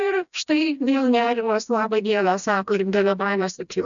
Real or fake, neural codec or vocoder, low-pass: fake; codec, 16 kHz, 1 kbps, FreqCodec, smaller model; 7.2 kHz